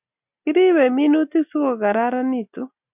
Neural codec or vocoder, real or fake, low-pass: none; real; 3.6 kHz